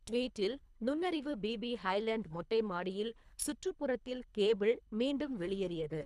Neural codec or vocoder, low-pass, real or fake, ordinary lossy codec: codec, 24 kHz, 3 kbps, HILCodec; none; fake; none